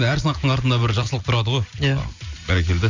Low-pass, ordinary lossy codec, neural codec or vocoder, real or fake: 7.2 kHz; Opus, 64 kbps; none; real